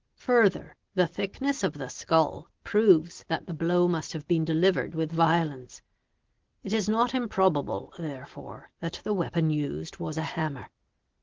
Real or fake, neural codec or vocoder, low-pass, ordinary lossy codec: real; none; 7.2 kHz; Opus, 16 kbps